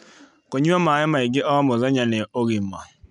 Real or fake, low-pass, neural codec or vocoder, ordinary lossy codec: real; 10.8 kHz; none; none